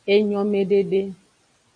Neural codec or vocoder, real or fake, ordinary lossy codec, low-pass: none; real; AAC, 48 kbps; 9.9 kHz